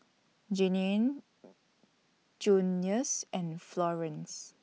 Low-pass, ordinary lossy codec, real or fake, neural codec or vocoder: none; none; real; none